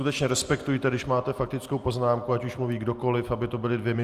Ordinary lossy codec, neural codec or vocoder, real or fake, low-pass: Opus, 32 kbps; none; real; 14.4 kHz